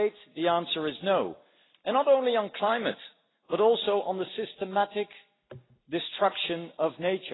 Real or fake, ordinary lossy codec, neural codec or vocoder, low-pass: real; AAC, 16 kbps; none; 7.2 kHz